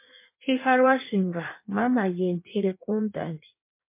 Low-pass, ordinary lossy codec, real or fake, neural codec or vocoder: 3.6 kHz; MP3, 24 kbps; fake; codec, 16 kHz in and 24 kHz out, 1.1 kbps, FireRedTTS-2 codec